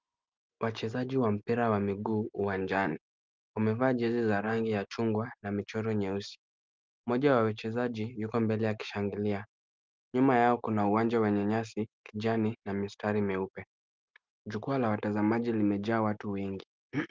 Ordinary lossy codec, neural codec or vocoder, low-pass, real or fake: Opus, 24 kbps; none; 7.2 kHz; real